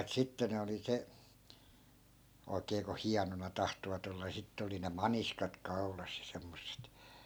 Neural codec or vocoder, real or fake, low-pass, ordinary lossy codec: none; real; none; none